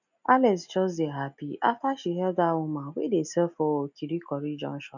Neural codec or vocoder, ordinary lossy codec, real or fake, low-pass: none; none; real; 7.2 kHz